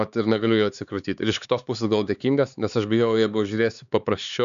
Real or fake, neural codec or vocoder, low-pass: fake; codec, 16 kHz, 4 kbps, X-Codec, HuBERT features, trained on LibriSpeech; 7.2 kHz